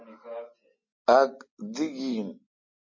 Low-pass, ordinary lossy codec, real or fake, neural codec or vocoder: 7.2 kHz; MP3, 32 kbps; real; none